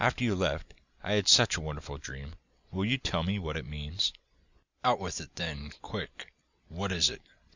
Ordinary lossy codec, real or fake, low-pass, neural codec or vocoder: Opus, 64 kbps; real; 7.2 kHz; none